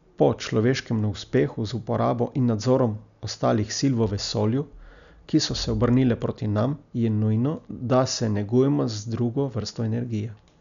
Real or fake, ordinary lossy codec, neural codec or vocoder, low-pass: real; none; none; 7.2 kHz